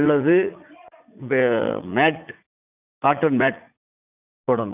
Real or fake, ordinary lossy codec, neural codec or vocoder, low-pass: fake; none; vocoder, 44.1 kHz, 80 mel bands, Vocos; 3.6 kHz